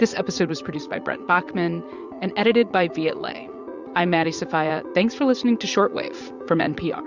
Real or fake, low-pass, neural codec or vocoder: fake; 7.2 kHz; vocoder, 44.1 kHz, 80 mel bands, Vocos